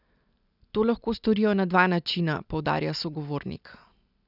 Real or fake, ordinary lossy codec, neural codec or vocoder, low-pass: real; none; none; 5.4 kHz